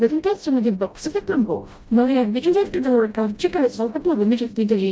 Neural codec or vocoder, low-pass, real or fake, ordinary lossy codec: codec, 16 kHz, 0.5 kbps, FreqCodec, smaller model; none; fake; none